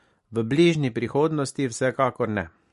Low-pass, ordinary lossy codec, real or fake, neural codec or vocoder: 14.4 kHz; MP3, 48 kbps; real; none